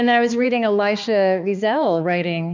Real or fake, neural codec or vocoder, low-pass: fake; codec, 16 kHz, 2 kbps, X-Codec, HuBERT features, trained on balanced general audio; 7.2 kHz